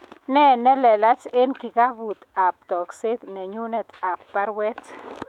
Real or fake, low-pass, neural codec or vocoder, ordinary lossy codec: fake; 19.8 kHz; autoencoder, 48 kHz, 128 numbers a frame, DAC-VAE, trained on Japanese speech; none